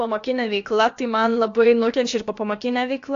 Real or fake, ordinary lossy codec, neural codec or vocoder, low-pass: fake; AAC, 48 kbps; codec, 16 kHz, about 1 kbps, DyCAST, with the encoder's durations; 7.2 kHz